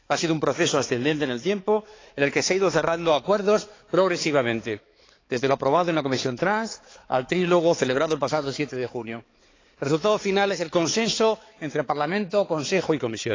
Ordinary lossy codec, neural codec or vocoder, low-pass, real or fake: AAC, 32 kbps; codec, 16 kHz, 4 kbps, X-Codec, HuBERT features, trained on balanced general audio; 7.2 kHz; fake